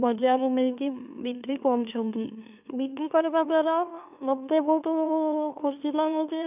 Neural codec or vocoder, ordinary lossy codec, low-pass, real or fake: autoencoder, 44.1 kHz, a latent of 192 numbers a frame, MeloTTS; none; 3.6 kHz; fake